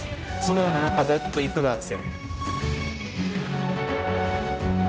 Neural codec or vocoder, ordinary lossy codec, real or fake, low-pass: codec, 16 kHz, 0.5 kbps, X-Codec, HuBERT features, trained on general audio; none; fake; none